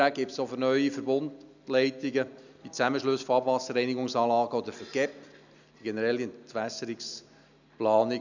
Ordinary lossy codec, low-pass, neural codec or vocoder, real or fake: none; 7.2 kHz; none; real